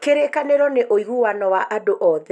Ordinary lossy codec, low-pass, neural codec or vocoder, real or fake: none; none; none; real